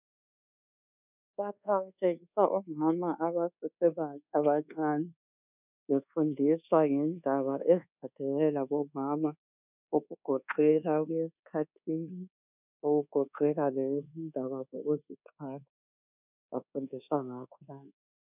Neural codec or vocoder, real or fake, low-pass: codec, 24 kHz, 1.2 kbps, DualCodec; fake; 3.6 kHz